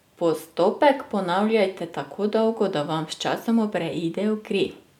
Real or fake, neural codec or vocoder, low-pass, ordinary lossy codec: real; none; 19.8 kHz; none